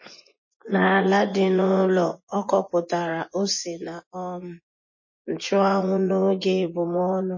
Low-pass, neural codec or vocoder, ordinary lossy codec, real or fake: 7.2 kHz; vocoder, 24 kHz, 100 mel bands, Vocos; MP3, 32 kbps; fake